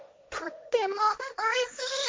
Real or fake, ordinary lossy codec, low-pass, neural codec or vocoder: fake; none; none; codec, 16 kHz, 1.1 kbps, Voila-Tokenizer